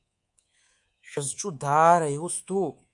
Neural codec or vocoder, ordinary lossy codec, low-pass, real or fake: codec, 24 kHz, 3.1 kbps, DualCodec; MP3, 64 kbps; 10.8 kHz; fake